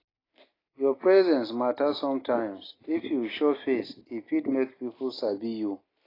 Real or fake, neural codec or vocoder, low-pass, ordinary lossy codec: real; none; 5.4 kHz; AAC, 24 kbps